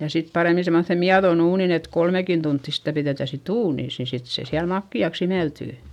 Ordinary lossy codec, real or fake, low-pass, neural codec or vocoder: none; real; 19.8 kHz; none